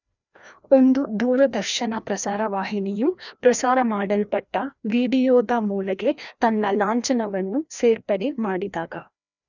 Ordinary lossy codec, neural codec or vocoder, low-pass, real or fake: none; codec, 16 kHz, 1 kbps, FreqCodec, larger model; 7.2 kHz; fake